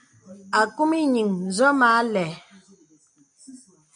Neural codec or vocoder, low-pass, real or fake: none; 9.9 kHz; real